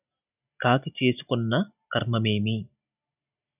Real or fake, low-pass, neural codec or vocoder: real; 3.6 kHz; none